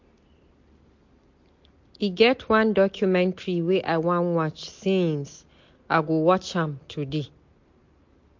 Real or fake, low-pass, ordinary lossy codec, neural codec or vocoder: real; 7.2 kHz; MP3, 48 kbps; none